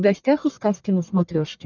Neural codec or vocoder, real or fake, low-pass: codec, 44.1 kHz, 1.7 kbps, Pupu-Codec; fake; 7.2 kHz